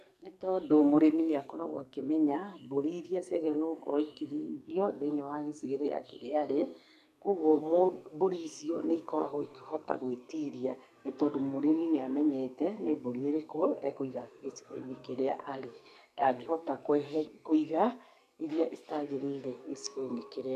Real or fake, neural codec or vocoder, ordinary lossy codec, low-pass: fake; codec, 44.1 kHz, 2.6 kbps, SNAC; none; 14.4 kHz